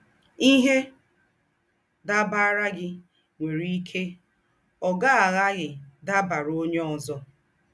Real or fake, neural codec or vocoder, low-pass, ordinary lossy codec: real; none; none; none